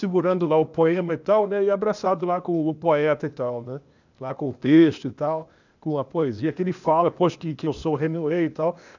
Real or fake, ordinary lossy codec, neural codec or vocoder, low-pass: fake; none; codec, 16 kHz, 0.8 kbps, ZipCodec; 7.2 kHz